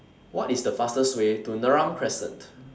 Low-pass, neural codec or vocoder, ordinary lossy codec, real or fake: none; none; none; real